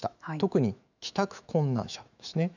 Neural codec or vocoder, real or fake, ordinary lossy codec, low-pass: autoencoder, 48 kHz, 128 numbers a frame, DAC-VAE, trained on Japanese speech; fake; none; 7.2 kHz